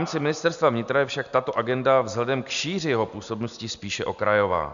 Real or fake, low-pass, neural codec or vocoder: real; 7.2 kHz; none